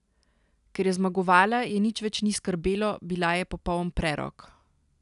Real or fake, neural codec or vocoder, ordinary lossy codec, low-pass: real; none; none; 10.8 kHz